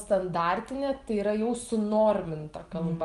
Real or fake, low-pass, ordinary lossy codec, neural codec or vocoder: real; 10.8 kHz; Opus, 24 kbps; none